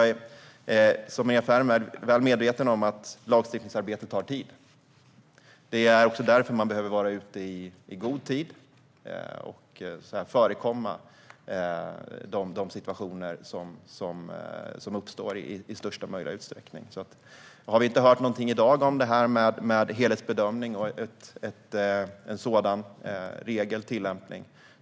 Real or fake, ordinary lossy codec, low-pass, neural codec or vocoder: real; none; none; none